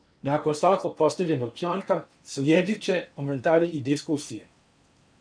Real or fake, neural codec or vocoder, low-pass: fake; codec, 16 kHz in and 24 kHz out, 0.8 kbps, FocalCodec, streaming, 65536 codes; 9.9 kHz